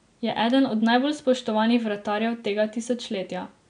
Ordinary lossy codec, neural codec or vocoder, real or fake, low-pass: none; none; real; 9.9 kHz